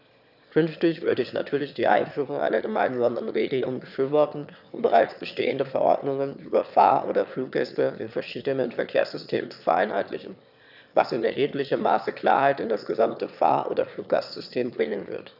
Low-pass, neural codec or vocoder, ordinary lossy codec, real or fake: 5.4 kHz; autoencoder, 22.05 kHz, a latent of 192 numbers a frame, VITS, trained on one speaker; none; fake